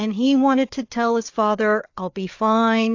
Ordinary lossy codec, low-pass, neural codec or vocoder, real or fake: AAC, 48 kbps; 7.2 kHz; codec, 16 kHz, 4 kbps, FreqCodec, larger model; fake